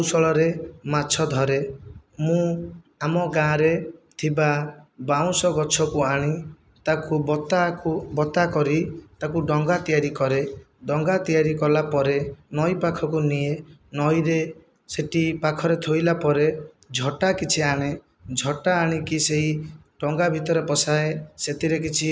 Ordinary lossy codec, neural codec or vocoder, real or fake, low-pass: none; none; real; none